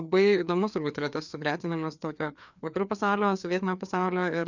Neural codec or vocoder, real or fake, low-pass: codec, 16 kHz, 2 kbps, FreqCodec, larger model; fake; 7.2 kHz